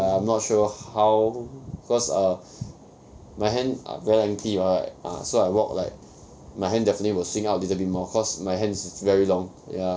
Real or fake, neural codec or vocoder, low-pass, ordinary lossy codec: real; none; none; none